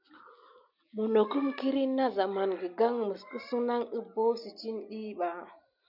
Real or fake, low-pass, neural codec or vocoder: real; 5.4 kHz; none